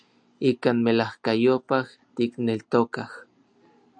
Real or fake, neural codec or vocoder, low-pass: real; none; 9.9 kHz